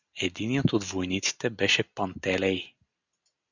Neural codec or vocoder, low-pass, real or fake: none; 7.2 kHz; real